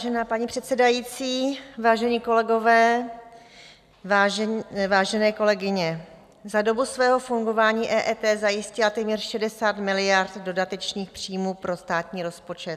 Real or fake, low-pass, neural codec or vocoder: real; 14.4 kHz; none